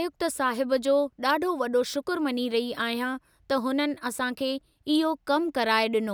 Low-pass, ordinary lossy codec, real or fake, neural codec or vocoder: none; none; real; none